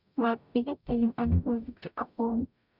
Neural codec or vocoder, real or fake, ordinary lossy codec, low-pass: codec, 44.1 kHz, 0.9 kbps, DAC; fake; none; 5.4 kHz